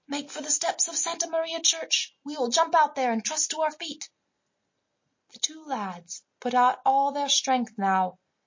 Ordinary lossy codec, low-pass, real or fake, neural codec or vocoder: MP3, 32 kbps; 7.2 kHz; real; none